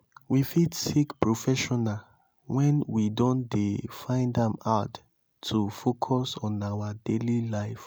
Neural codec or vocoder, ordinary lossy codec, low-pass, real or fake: none; none; none; real